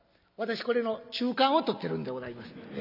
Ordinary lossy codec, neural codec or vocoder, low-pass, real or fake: none; none; 5.4 kHz; real